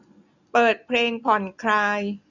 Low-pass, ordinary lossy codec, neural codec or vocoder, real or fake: 7.2 kHz; none; none; real